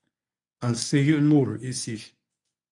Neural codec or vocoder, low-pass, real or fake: codec, 24 kHz, 0.9 kbps, WavTokenizer, medium speech release version 1; 10.8 kHz; fake